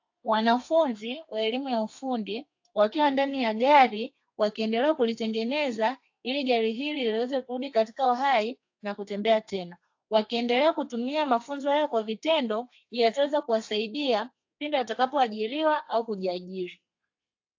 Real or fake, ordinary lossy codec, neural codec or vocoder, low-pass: fake; AAC, 48 kbps; codec, 32 kHz, 1.9 kbps, SNAC; 7.2 kHz